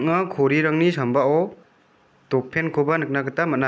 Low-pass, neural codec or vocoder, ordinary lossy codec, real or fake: none; none; none; real